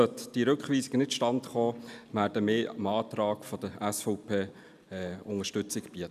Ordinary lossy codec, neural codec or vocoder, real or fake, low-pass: none; none; real; 14.4 kHz